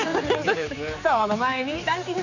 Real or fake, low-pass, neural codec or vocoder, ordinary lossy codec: fake; 7.2 kHz; codec, 16 kHz, 4 kbps, X-Codec, HuBERT features, trained on general audio; none